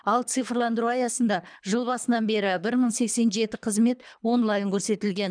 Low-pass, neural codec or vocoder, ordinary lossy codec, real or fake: 9.9 kHz; codec, 24 kHz, 3 kbps, HILCodec; none; fake